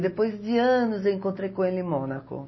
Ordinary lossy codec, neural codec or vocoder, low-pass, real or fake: MP3, 24 kbps; none; 7.2 kHz; real